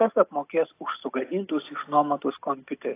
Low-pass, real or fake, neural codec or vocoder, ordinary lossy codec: 3.6 kHz; real; none; AAC, 24 kbps